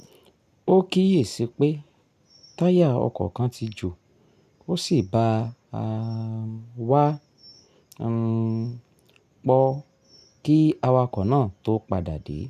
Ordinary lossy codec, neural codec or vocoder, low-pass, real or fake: none; none; 14.4 kHz; real